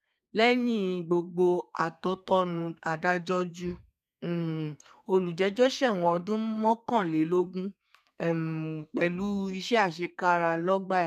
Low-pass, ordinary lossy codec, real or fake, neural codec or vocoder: 14.4 kHz; none; fake; codec, 32 kHz, 1.9 kbps, SNAC